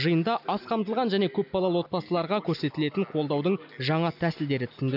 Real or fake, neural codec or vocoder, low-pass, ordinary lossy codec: real; none; 5.4 kHz; none